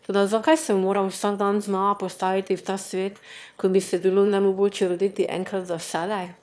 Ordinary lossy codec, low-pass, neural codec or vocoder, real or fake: none; none; autoencoder, 22.05 kHz, a latent of 192 numbers a frame, VITS, trained on one speaker; fake